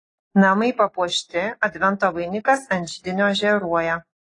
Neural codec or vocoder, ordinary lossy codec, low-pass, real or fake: none; AAC, 32 kbps; 10.8 kHz; real